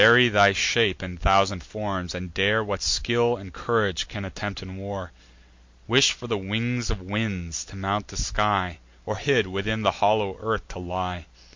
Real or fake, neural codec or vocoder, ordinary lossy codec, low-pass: real; none; MP3, 48 kbps; 7.2 kHz